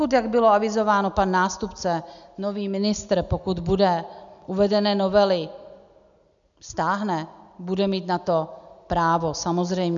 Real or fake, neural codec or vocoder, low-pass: real; none; 7.2 kHz